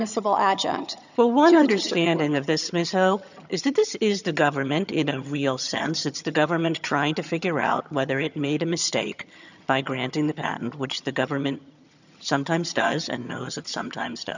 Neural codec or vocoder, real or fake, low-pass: vocoder, 22.05 kHz, 80 mel bands, HiFi-GAN; fake; 7.2 kHz